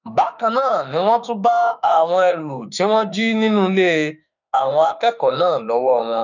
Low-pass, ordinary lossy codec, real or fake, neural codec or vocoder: 7.2 kHz; none; fake; autoencoder, 48 kHz, 32 numbers a frame, DAC-VAE, trained on Japanese speech